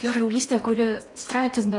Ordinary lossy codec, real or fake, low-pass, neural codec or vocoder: AAC, 48 kbps; fake; 10.8 kHz; codec, 16 kHz in and 24 kHz out, 0.8 kbps, FocalCodec, streaming, 65536 codes